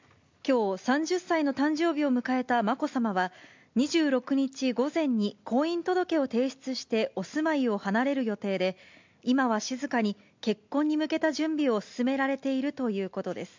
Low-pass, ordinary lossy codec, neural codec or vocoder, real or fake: 7.2 kHz; MP3, 64 kbps; none; real